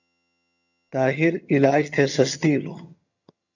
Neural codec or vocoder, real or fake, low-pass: vocoder, 22.05 kHz, 80 mel bands, HiFi-GAN; fake; 7.2 kHz